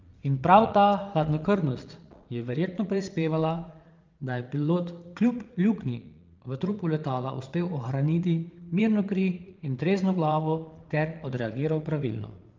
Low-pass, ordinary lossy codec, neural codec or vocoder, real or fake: 7.2 kHz; Opus, 32 kbps; vocoder, 22.05 kHz, 80 mel bands, Vocos; fake